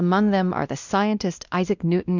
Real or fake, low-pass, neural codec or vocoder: fake; 7.2 kHz; codec, 16 kHz, 1 kbps, X-Codec, WavLM features, trained on Multilingual LibriSpeech